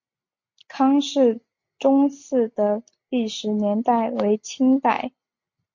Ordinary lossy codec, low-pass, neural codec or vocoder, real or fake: MP3, 48 kbps; 7.2 kHz; none; real